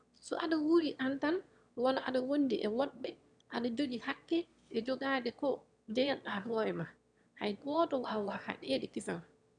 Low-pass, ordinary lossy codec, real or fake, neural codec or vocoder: 9.9 kHz; none; fake; autoencoder, 22.05 kHz, a latent of 192 numbers a frame, VITS, trained on one speaker